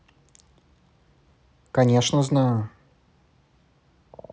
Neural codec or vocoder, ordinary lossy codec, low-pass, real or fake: none; none; none; real